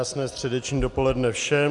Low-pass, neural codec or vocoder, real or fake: 10.8 kHz; none; real